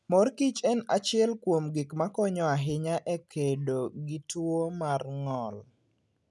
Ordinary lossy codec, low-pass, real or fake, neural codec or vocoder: none; none; real; none